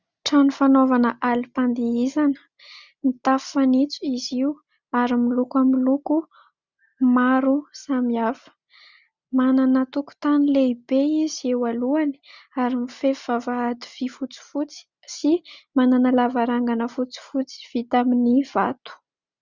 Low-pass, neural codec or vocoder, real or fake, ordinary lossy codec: 7.2 kHz; none; real; Opus, 64 kbps